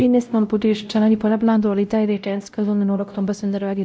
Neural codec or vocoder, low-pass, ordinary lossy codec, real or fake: codec, 16 kHz, 0.5 kbps, X-Codec, WavLM features, trained on Multilingual LibriSpeech; none; none; fake